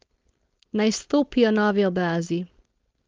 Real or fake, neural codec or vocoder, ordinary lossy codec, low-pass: fake; codec, 16 kHz, 4.8 kbps, FACodec; Opus, 32 kbps; 7.2 kHz